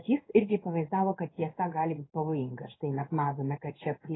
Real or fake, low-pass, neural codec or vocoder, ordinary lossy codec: fake; 7.2 kHz; codec, 16 kHz in and 24 kHz out, 1 kbps, XY-Tokenizer; AAC, 16 kbps